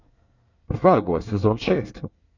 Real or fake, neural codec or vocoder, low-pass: fake; codec, 24 kHz, 1 kbps, SNAC; 7.2 kHz